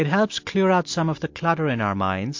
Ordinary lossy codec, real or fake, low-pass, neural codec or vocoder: MP3, 48 kbps; real; 7.2 kHz; none